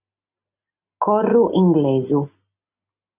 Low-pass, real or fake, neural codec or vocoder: 3.6 kHz; real; none